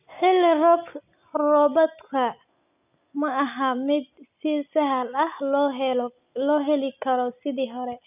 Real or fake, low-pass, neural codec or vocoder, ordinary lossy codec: real; 3.6 kHz; none; none